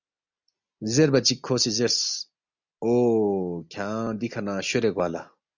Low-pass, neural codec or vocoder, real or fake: 7.2 kHz; none; real